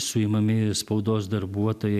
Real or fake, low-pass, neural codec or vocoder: real; 14.4 kHz; none